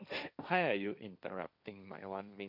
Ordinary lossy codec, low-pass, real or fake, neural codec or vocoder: none; 5.4 kHz; fake; codec, 16 kHz, 1.1 kbps, Voila-Tokenizer